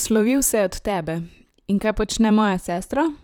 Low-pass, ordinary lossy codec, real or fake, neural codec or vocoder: 19.8 kHz; none; fake; vocoder, 44.1 kHz, 128 mel bands, Pupu-Vocoder